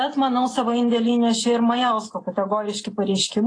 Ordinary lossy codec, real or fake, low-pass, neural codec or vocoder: AAC, 32 kbps; real; 9.9 kHz; none